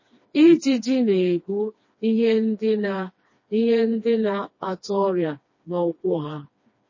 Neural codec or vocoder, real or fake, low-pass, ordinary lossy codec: codec, 16 kHz, 2 kbps, FreqCodec, smaller model; fake; 7.2 kHz; MP3, 32 kbps